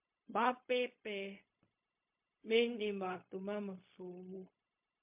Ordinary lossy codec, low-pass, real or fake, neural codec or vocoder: MP3, 32 kbps; 3.6 kHz; fake; codec, 16 kHz, 0.4 kbps, LongCat-Audio-Codec